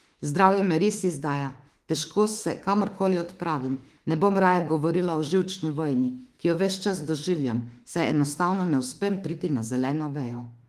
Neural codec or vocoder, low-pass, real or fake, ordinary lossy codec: autoencoder, 48 kHz, 32 numbers a frame, DAC-VAE, trained on Japanese speech; 14.4 kHz; fake; Opus, 24 kbps